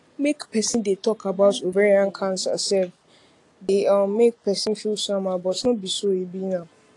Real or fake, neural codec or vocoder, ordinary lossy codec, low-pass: real; none; AAC, 48 kbps; 10.8 kHz